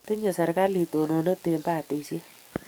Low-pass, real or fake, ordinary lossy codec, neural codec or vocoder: none; fake; none; codec, 44.1 kHz, 7.8 kbps, DAC